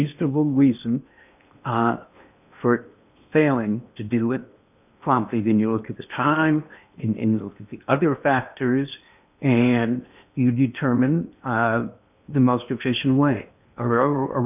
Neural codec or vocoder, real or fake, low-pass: codec, 16 kHz in and 24 kHz out, 0.8 kbps, FocalCodec, streaming, 65536 codes; fake; 3.6 kHz